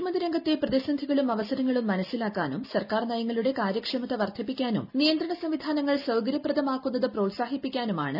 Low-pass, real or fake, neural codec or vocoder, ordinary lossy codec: 5.4 kHz; real; none; none